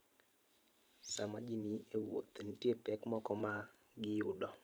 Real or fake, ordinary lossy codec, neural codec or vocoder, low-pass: fake; none; vocoder, 44.1 kHz, 128 mel bands every 512 samples, BigVGAN v2; none